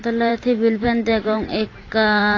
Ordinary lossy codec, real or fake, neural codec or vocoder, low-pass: AAC, 32 kbps; fake; vocoder, 44.1 kHz, 128 mel bands every 512 samples, BigVGAN v2; 7.2 kHz